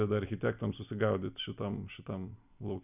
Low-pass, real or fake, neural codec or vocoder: 3.6 kHz; real; none